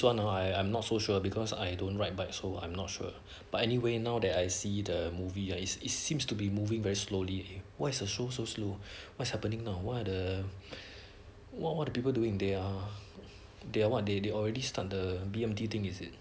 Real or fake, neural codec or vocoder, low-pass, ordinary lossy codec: real; none; none; none